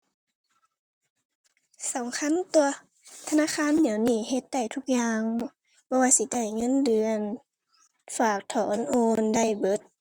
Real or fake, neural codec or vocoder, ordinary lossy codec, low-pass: fake; vocoder, 44.1 kHz, 128 mel bands, Pupu-Vocoder; Opus, 64 kbps; 19.8 kHz